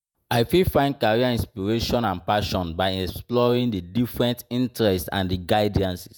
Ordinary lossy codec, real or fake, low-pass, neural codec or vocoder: none; fake; none; vocoder, 48 kHz, 128 mel bands, Vocos